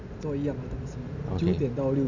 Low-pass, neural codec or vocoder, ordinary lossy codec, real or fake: 7.2 kHz; none; none; real